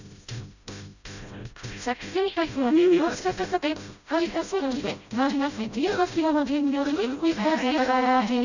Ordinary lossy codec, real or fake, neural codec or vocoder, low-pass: none; fake; codec, 16 kHz, 0.5 kbps, FreqCodec, smaller model; 7.2 kHz